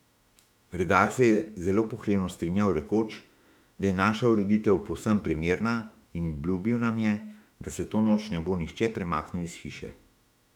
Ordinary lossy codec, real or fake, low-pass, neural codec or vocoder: none; fake; 19.8 kHz; autoencoder, 48 kHz, 32 numbers a frame, DAC-VAE, trained on Japanese speech